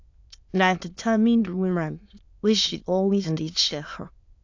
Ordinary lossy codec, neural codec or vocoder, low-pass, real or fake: AAC, 48 kbps; autoencoder, 22.05 kHz, a latent of 192 numbers a frame, VITS, trained on many speakers; 7.2 kHz; fake